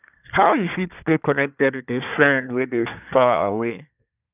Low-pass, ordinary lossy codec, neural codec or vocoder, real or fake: 3.6 kHz; none; codec, 24 kHz, 1 kbps, SNAC; fake